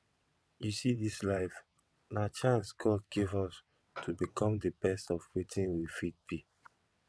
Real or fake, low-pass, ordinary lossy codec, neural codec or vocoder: fake; none; none; vocoder, 22.05 kHz, 80 mel bands, WaveNeXt